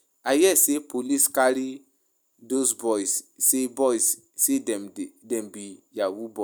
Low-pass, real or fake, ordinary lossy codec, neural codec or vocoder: none; real; none; none